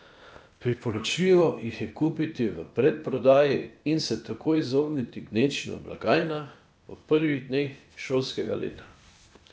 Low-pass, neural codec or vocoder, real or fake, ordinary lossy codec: none; codec, 16 kHz, 0.8 kbps, ZipCodec; fake; none